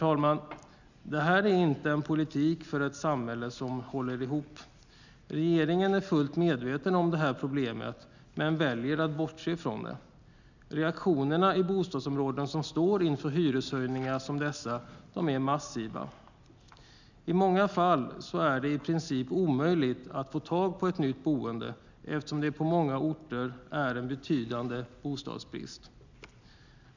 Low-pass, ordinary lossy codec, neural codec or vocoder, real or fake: 7.2 kHz; none; none; real